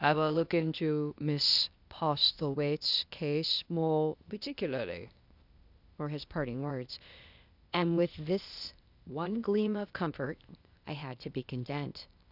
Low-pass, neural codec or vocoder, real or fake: 5.4 kHz; codec, 16 kHz, 0.8 kbps, ZipCodec; fake